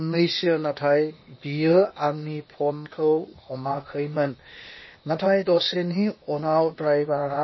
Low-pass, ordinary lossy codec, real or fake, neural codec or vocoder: 7.2 kHz; MP3, 24 kbps; fake; codec, 16 kHz, 0.8 kbps, ZipCodec